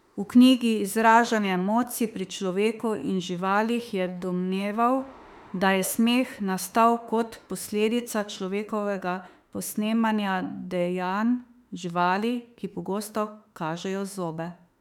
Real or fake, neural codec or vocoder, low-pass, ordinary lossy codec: fake; autoencoder, 48 kHz, 32 numbers a frame, DAC-VAE, trained on Japanese speech; 19.8 kHz; none